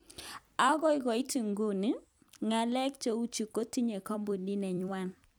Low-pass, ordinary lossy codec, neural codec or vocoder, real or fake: none; none; vocoder, 44.1 kHz, 128 mel bands every 256 samples, BigVGAN v2; fake